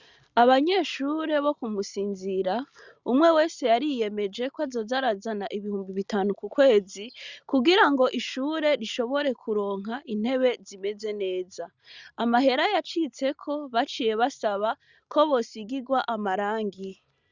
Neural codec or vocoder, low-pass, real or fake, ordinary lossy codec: none; 7.2 kHz; real; Opus, 64 kbps